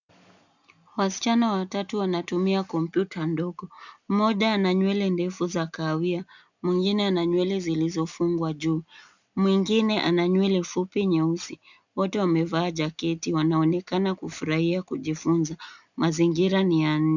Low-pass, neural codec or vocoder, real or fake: 7.2 kHz; none; real